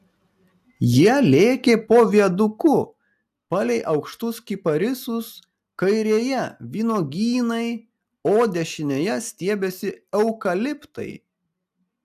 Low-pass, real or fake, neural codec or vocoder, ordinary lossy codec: 14.4 kHz; real; none; AAC, 96 kbps